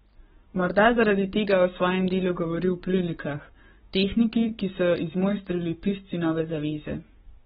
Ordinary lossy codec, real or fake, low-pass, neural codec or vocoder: AAC, 16 kbps; fake; 19.8 kHz; codec, 44.1 kHz, 7.8 kbps, Pupu-Codec